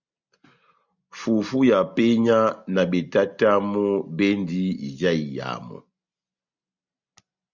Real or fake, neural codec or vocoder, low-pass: real; none; 7.2 kHz